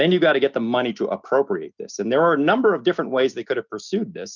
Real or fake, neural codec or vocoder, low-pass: real; none; 7.2 kHz